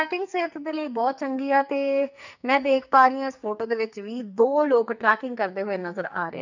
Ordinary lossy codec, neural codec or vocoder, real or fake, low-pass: none; codec, 44.1 kHz, 2.6 kbps, SNAC; fake; 7.2 kHz